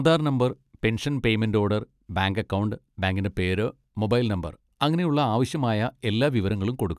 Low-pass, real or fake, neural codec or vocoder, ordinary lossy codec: 14.4 kHz; real; none; none